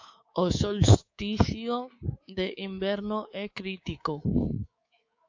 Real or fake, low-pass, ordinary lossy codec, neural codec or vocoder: fake; 7.2 kHz; AAC, 48 kbps; codec, 24 kHz, 3.1 kbps, DualCodec